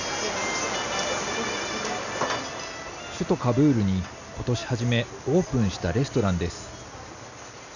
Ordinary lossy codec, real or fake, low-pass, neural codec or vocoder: none; real; 7.2 kHz; none